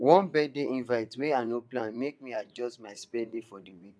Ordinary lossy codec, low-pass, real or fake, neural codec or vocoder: none; none; fake; vocoder, 22.05 kHz, 80 mel bands, WaveNeXt